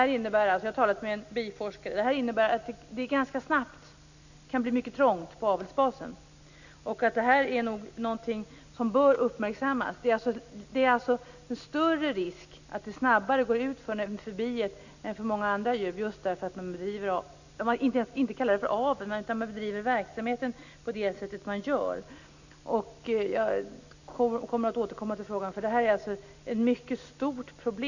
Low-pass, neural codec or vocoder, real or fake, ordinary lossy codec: 7.2 kHz; none; real; none